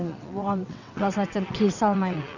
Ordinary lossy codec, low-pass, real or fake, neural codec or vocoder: none; 7.2 kHz; fake; vocoder, 22.05 kHz, 80 mel bands, WaveNeXt